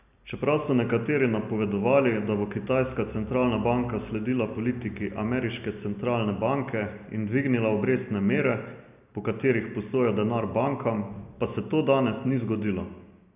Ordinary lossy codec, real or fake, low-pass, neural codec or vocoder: none; real; 3.6 kHz; none